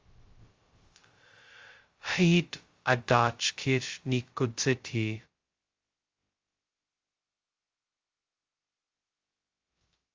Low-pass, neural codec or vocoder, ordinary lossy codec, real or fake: 7.2 kHz; codec, 16 kHz, 0.2 kbps, FocalCodec; Opus, 32 kbps; fake